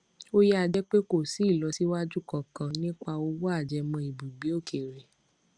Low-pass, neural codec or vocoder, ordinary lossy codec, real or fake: 9.9 kHz; none; Opus, 64 kbps; real